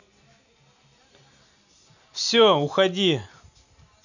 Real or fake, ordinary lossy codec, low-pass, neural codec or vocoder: real; none; 7.2 kHz; none